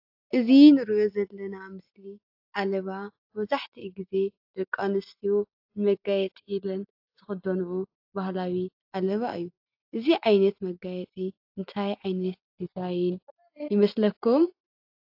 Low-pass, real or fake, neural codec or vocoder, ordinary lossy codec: 5.4 kHz; real; none; AAC, 48 kbps